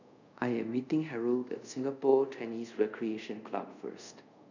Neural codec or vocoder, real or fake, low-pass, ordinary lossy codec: codec, 24 kHz, 0.5 kbps, DualCodec; fake; 7.2 kHz; none